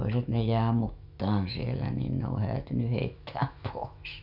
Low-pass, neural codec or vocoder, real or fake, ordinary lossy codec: 5.4 kHz; autoencoder, 48 kHz, 128 numbers a frame, DAC-VAE, trained on Japanese speech; fake; none